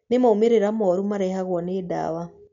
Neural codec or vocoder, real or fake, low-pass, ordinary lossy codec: none; real; 7.2 kHz; MP3, 96 kbps